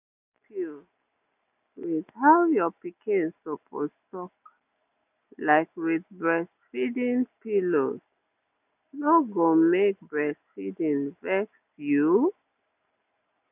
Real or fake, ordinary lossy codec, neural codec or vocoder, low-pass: real; none; none; 3.6 kHz